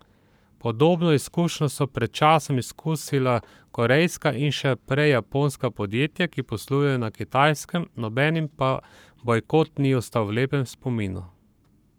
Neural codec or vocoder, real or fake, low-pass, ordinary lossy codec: codec, 44.1 kHz, 7.8 kbps, Pupu-Codec; fake; none; none